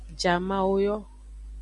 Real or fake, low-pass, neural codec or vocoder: real; 10.8 kHz; none